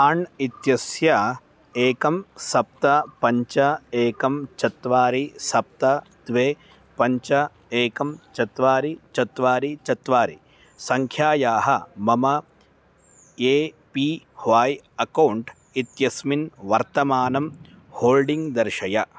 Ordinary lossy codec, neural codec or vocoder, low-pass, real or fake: none; none; none; real